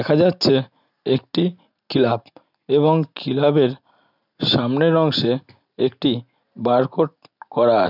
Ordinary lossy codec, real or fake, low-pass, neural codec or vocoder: none; real; 5.4 kHz; none